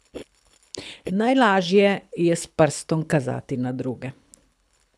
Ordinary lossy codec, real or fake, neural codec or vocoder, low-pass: none; fake; codec, 24 kHz, 6 kbps, HILCodec; none